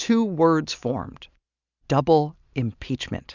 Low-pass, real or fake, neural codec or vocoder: 7.2 kHz; real; none